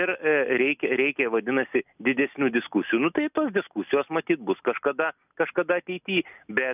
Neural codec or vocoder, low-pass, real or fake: none; 3.6 kHz; real